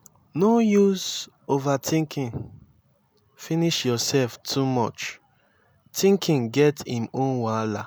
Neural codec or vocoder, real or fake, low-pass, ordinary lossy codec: none; real; none; none